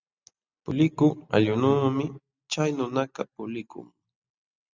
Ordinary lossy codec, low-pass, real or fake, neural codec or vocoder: Opus, 64 kbps; 7.2 kHz; real; none